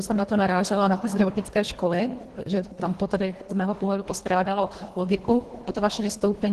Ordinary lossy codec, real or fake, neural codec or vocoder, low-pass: Opus, 16 kbps; fake; codec, 24 kHz, 1.5 kbps, HILCodec; 10.8 kHz